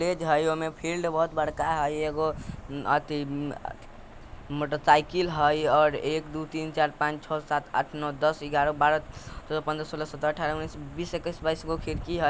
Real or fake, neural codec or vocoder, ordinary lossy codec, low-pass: real; none; none; none